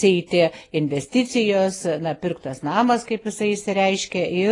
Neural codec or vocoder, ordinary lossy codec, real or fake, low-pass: none; AAC, 32 kbps; real; 10.8 kHz